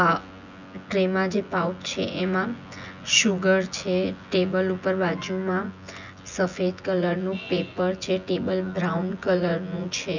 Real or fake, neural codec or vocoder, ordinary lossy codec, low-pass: fake; vocoder, 24 kHz, 100 mel bands, Vocos; none; 7.2 kHz